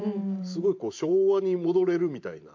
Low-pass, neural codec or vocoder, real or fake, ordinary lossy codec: 7.2 kHz; vocoder, 44.1 kHz, 128 mel bands every 512 samples, BigVGAN v2; fake; MP3, 48 kbps